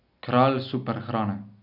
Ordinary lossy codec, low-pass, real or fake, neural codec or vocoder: none; 5.4 kHz; real; none